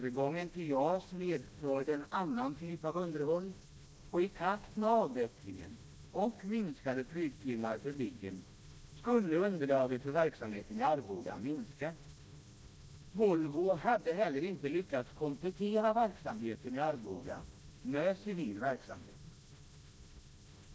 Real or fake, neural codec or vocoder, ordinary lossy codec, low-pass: fake; codec, 16 kHz, 1 kbps, FreqCodec, smaller model; none; none